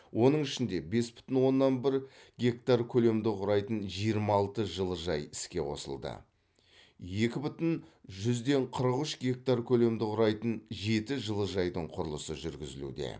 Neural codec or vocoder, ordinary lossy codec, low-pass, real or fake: none; none; none; real